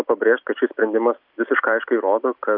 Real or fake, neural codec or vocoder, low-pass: real; none; 5.4 kHz